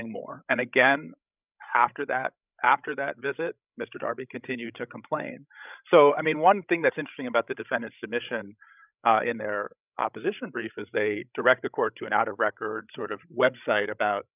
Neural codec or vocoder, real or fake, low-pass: codec, 16 kHz, 16 kbps, FreqCodec, larger model; fake; 3.6 kHz